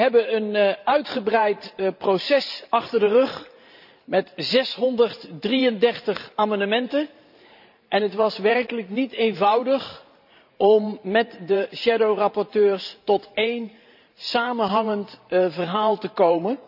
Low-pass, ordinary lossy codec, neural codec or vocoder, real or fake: 5.4 kHz; none; vocoder, 44.1 kHz, 128 mel bands every 512 samples, BigVGAN v2; fake